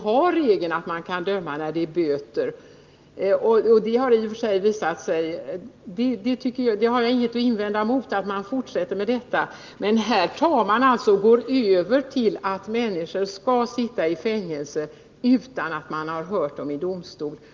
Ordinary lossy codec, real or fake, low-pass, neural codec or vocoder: Opus, 32 kbps; real; 7.2 kHz; none